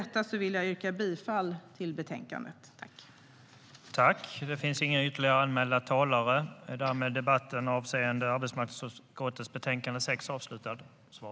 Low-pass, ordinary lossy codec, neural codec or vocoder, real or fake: none; none; none; real